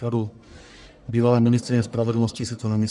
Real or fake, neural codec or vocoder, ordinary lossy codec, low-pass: fake; codec, 44.1 kHz, 1.7 kbps, Pupu-Codec; Opus, 64 kbps; 10.8 kHz